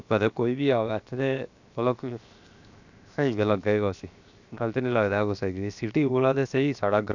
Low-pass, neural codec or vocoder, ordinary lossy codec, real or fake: 7.2 kHz; codec, 16 kHz, 0.7 kbps, FocalCodec; none; fake